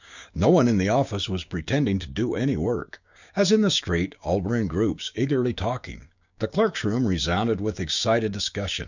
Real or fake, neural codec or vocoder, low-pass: fake; codec, 16 kHz, 6 kbps, DAC; 7.2 kHz